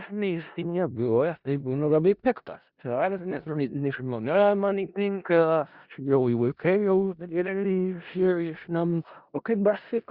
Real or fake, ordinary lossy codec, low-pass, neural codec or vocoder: fake; Opus, 64 kbps; 5.4 kHz; codec, 16 kHz in and 24 kHz out, 0.4 kbps, LongCat-Audio-Codec, four codebook decoder